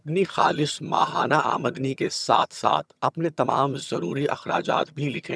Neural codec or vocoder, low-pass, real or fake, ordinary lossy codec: vocoder, 22.05 kHz, 80 mel bands, HiFi-GAN; none; fake; none